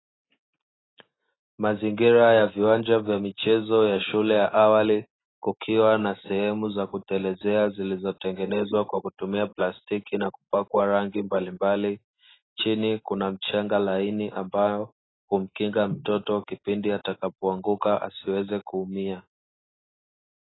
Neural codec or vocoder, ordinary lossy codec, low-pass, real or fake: none; AAC, 16 kbps; 7.2 kHz; real